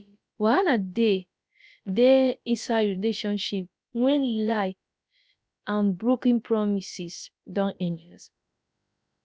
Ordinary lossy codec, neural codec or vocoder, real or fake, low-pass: none; codec, 16 kHz, about 1 kbps, DyCAST, with the encoder's durations; fake; none